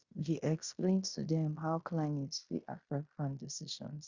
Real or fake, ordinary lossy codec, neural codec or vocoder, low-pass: fake; Opus, 64 kbps; codec, 16 kHz in and 24 kHz out, 0.9 kbps, LongCat-Audio-Codec, fine tuned four codebook decoder; 7.2 kHz